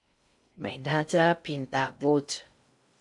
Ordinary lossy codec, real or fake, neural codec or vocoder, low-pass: AAC, 64 kbps; fake; codec, 16 kHz in and 24 kHz out, 0.6 kbps, FocalCodec, streaming, 4096 codes; 10.8 kHz